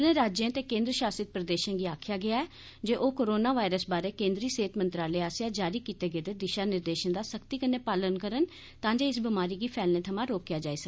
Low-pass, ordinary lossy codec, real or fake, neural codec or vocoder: none; none; real; none